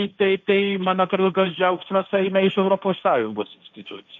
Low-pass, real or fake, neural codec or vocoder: 7.2 kHz; fake; codec, 16 kHz, 1.1 kbps, Voila-Tokenizer